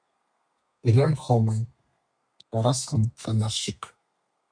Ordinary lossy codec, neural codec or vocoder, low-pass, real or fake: MP3, 96 kbps; codec, 32 kHz, 1.9 kbps, SNAC; 9.9 kHz; fake